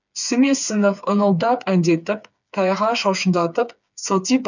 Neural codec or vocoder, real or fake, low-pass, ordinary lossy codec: codec, 16 kHz, 4 kbps, FreqCodec, smaller model; fake; 7.2 kHz; none